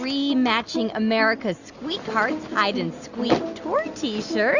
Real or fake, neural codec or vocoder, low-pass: real; none; 7.2 kHz